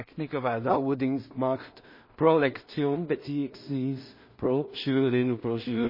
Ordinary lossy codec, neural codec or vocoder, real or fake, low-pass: MP3, 24 kbps; codec, 16 kHz in and 24 kHz out, 0.4 kbps, LongCat-Audio-Codec, two codebook decoder; fake; 5.4 kHz